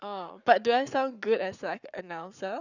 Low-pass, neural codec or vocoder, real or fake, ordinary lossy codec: 7.2 kHz; codec, 44.1 kHz, 7.8 kbps, Pupu-Codec; fake; none